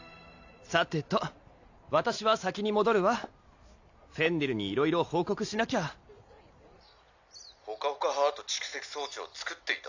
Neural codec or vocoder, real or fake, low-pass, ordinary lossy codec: none; real; 7.2 kHz; AAC, 48 kbps